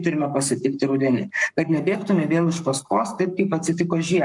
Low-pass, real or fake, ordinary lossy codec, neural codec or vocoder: 10.8 kHz; fake; MP3, 96 kbps; codec, 44.1 kHz, 7.8 kbps, Pupu-Codec